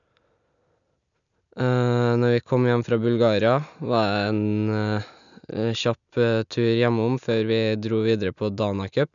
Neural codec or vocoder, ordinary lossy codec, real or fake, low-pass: none; none; real; 7.2 kHz